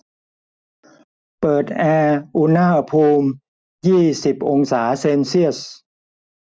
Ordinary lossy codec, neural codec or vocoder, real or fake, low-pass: none; none; real; none